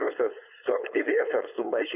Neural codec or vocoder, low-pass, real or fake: codec, 16 kHz, 4.8 kbps, FACodec; 3.6 kHz; fake